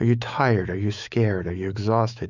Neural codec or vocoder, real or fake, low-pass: autoencoder, 48 kHz, 128 numbers a frame, DAC-VAE, trained on Japanese speech; fake; 7.2 kHz